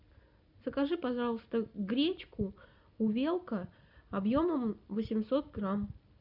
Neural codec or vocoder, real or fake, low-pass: none; real; 5.4 kHz